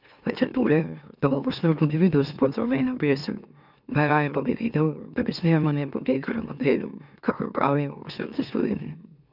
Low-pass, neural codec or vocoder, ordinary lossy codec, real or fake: 5.4 kHz; autoencoder, 44.1 kHz, a latent of 192 numbers a frame, MeloTTS; none; fake